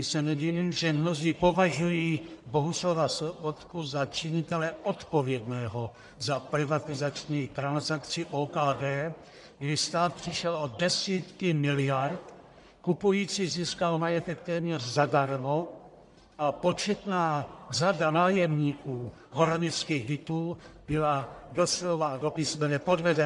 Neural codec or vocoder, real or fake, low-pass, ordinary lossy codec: codec, 44.1 kHz, 1.7 kbps, Pupu-Codec; fake; 10.8 kHz; MP3, 96 kbps